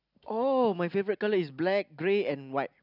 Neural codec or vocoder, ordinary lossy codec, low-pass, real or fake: none; none; 5.4 kHz; real